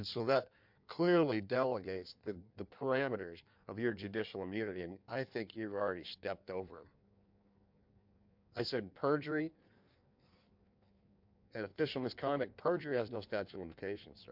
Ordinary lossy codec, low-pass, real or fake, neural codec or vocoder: MP3, 48 kbps; 5.4 kHz; fake; codec, 16 kHz in and 24 kHz out, 1.1 kbps, FireRedTTS-2 codec